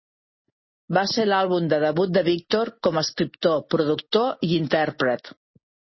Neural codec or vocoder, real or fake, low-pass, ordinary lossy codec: none; real; 7.2 kHz; MP3, 24 kbps